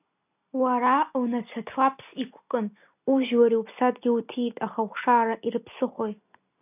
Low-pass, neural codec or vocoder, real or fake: 3.6 kHz; none; real